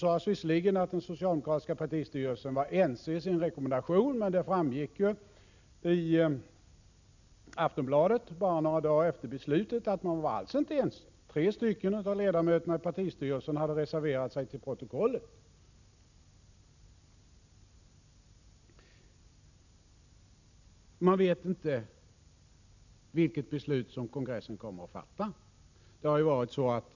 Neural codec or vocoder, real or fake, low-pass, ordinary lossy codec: none; real; 7.2 kHz; none